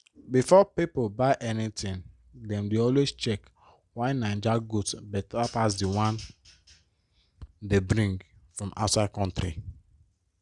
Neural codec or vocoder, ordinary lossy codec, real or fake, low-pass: none; Opus, 64 kbps; real; 10.8 kHz